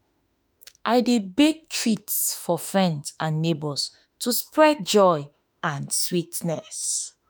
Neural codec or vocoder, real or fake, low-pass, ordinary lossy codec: autoencoder, 48 kHz, 32 numbers a frame, DAC-VAE, trained on Japanese speech; fake; none; none